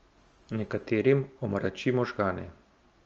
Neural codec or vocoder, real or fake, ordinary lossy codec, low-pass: none; real; Opus, 24 kbps; 7.2 kHz